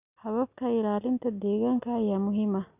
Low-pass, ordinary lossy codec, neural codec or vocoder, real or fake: 3.6 kHz; none; none; real